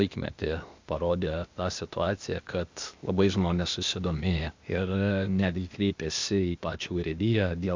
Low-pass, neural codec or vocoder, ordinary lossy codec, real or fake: 7.2 kHz; codec, 16 kHz, 0.8 kbps, ZipCodec; MP3, 64 kbps; fake